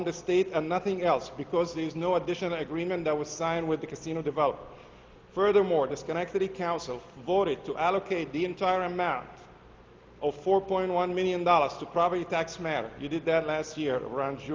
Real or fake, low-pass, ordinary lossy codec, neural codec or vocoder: real; 7.2 kHz; Opus, 32 kbps; none